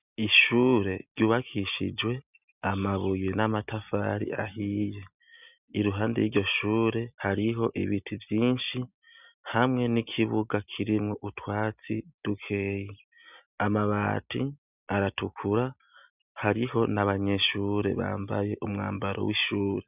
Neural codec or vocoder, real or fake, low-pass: none; real; 3.6 kHz